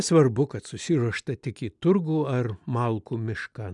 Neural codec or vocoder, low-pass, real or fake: none; 10.8 kHz; real